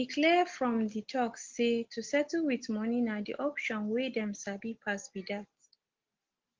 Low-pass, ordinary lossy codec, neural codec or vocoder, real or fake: 7.2 kHz; Opus, 16 kbps; none; real